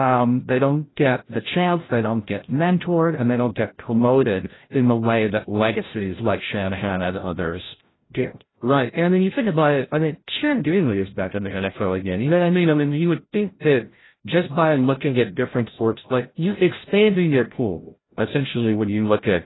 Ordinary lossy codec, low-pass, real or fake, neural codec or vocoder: AAC, 16 kbps; 7.2 kHz; fake; codec, 16 kHz, 0.5 kbps, FreqCodec, larger model